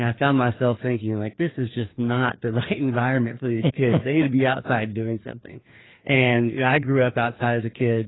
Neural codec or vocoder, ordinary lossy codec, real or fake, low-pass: codec, 16 kHz, 2 kbps, FreqCodec, larger model; AAC, 16 kbps; fake; 7.2 kHz